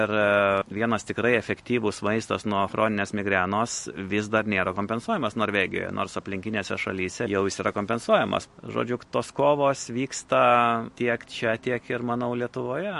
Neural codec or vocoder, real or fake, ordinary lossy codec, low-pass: none; real; MP3, 48 kbps; 14.4 kHz